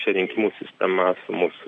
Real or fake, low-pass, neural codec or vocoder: real; 9.9 kHz; none